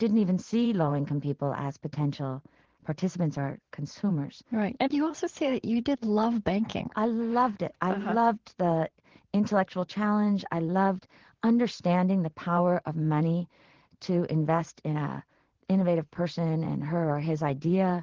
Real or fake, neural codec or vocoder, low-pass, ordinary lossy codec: fake; vocoder, 44.1 kHz, 128 mel bands every 512 samples, BigVGAN v2; 7.2 kHz; Opus, 16 kbps